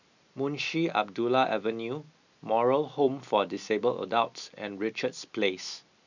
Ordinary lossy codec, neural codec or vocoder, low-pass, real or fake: none; none; 7.2 kHz; real